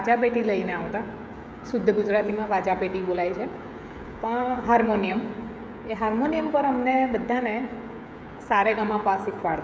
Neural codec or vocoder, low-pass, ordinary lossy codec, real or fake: codec, 16 kHz, 16 kbps, FreqCodec, smaller model; none; none; fake